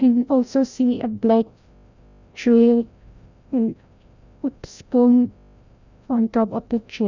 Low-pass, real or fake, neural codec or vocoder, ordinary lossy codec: 7.2 kHz; fake; codec, 16 kHz, 0.5 kbps, FreqCodec, larger model; none